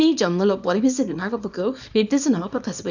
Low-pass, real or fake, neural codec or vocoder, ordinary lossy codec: 7.2 kHz; fake; codec, 24 kHz, 0.9 kbps, WavTokenizer, small release; none